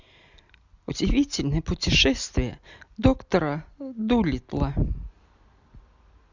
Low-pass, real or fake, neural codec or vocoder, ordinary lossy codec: 7.2 kHz; real; none; Opus, 64 kbps